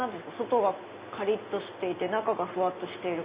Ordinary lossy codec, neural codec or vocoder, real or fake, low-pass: Opus, 64 kbps; none; real; 3.6 kHz